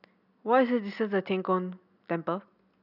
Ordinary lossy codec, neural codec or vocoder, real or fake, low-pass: none; none; real; 5.4 kHz